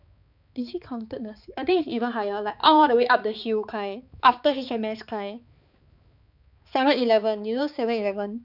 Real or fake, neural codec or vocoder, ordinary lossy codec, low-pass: fake; codec, 16 kHz, 4 kbps, X-Codec, HuBERT features, trained on balanced general audio; none; 5.4 kHz